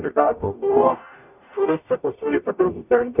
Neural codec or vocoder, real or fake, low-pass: codec, 44.1 kHz, 0.9 kbps, DAC; fake; 3.6 kHz